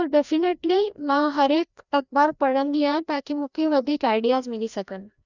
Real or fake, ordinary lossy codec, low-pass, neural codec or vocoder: fake; none; 7.2 kHz; codec, 16 kHz, 1 kbps, FreqCodec, larger model